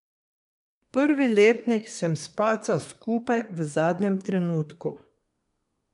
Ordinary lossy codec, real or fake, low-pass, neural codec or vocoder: none; fake; 10.8 kHz; codec, 24 kHz, 1 kbps, SNAC